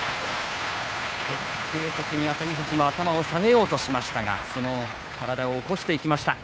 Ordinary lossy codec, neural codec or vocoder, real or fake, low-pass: none; codec, 16 kHz, 2 kbps, FunCodec, trained on Chinese and English, 25 frames a second; fake; none